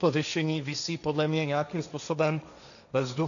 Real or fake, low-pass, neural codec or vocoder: fake; 7.2 kHz; codec, 16 kHz, 1.1 kbps, Voila-Tokenizer